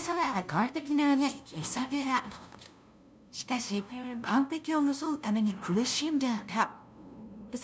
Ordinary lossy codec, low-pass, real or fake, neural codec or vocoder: none; none; fake; codec, 16 kHz, 0.5 kbps, FunCodec, trained on LibriTTS, 25 frames a second